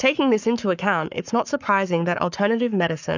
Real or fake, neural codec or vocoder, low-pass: fake; codec, 44.1 kHz, 7.8 kbps, Pupu-Codec; 7.2 kHz